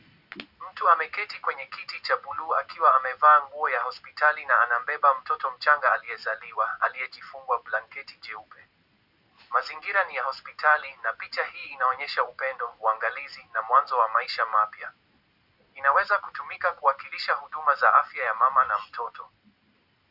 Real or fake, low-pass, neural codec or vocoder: real; 5.4 kHz; none